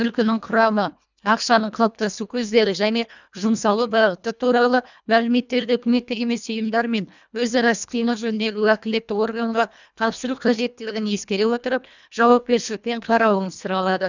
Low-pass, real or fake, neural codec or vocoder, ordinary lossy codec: 7.2 kHz; fake; codec, 24 kHz, 1.5 kbps, HILCodec; none